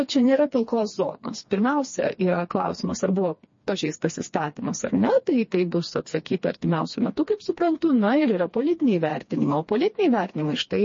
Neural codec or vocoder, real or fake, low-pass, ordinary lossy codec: codec, 16 kHz, 2 kbps, FreqCodec, smaller model; fake; 7.2 kHz; MP3, 32 kbps